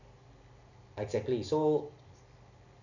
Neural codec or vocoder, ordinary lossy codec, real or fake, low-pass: none; none; real; 7.2 kHz